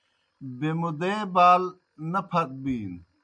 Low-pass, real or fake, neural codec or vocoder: 9.9 kHz; real; none